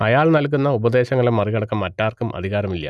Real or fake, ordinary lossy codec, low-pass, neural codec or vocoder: real; none; none; none